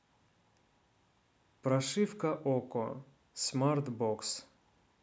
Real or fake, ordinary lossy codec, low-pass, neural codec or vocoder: real; none; none; none